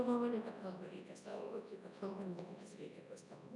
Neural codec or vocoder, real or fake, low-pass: codec, 24 kHz, 0.9 kbps, WavTokenizer, large speech release; fake; 10.8 kHz